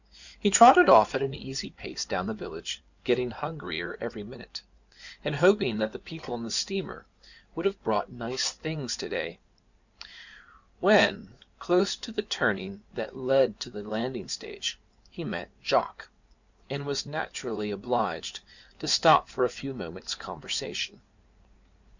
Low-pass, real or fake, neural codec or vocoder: 7.2 kHz; fake; codec, 16 kHz in and 24 kHz out, 2.2 kbps, FireRedTTS-2 codec